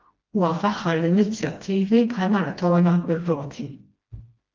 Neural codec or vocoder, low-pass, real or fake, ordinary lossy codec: codec, 16 kHz, 1 kbps, FreqCodec, smaller model; 7.2 kHz; fake; Opus, 24 kbps